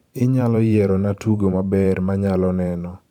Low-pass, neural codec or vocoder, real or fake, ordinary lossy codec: 19.8 kHz; vocoder, 44.1 kHz, 128 mel bands every 512 samples, BigVGAN v2; fake; none